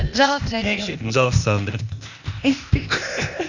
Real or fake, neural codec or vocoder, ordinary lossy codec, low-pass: fake; codec, 16 kHz, 0.8 kbps, ZipCodec; none; 7.2 kHz